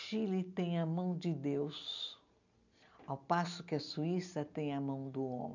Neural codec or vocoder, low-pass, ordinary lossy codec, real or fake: none; 7.2 kHz; none; real